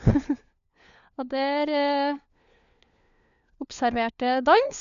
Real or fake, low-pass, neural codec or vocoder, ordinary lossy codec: fake; 7.2 kHz; codec, 16 kHz, 4 kbps, FunCodec, trained on LibriTTS, 50 frames a second; none